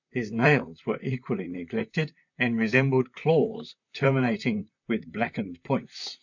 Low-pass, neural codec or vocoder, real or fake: 7.2 kHz; vocoder, 44.1 kHz, 128 mel bands, Pupu-Vocoder; fake